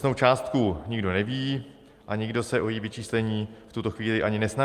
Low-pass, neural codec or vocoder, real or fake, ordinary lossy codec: 14.4 kHz; none; real; Opus, 32 kbps